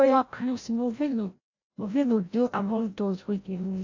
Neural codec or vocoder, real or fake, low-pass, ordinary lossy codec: codec, 16 kHz, 0.5 kbps, FreqCodec, larger model; fake; 7.2 kHz; AAC, 48 kbps